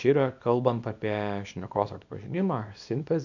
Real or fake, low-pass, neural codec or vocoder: fake; 7.2 kHz; codec, 24 kHz, 0.9 kbps, WavTokenizer, small release